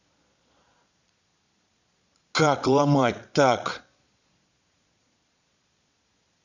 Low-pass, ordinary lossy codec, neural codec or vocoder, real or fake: 7.2 kHz; none; vocoder, 22.05 kHz, 80 mel bands, Vocos; fake